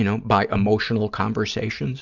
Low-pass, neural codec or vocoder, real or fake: 7.2 kHz; none; real